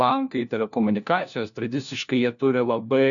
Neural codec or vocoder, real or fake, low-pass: codec, 16 kHz, 1 kbps, FunCodec, trained on LibriTTS, 50 frames a second; fake; 7.2 kHz